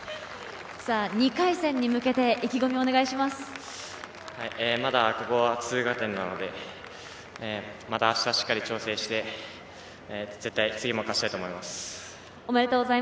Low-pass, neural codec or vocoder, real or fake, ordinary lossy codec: none; none; real; none